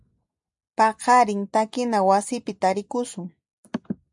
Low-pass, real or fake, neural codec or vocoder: 10.8 kHz; real; none